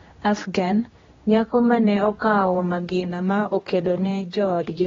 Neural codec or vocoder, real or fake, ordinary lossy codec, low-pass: codec, 16 kHz, 1 kbps, X-Codec, HuBERT features, trained on general audio; fake; AAC, 24 kbps; 7.2 kHz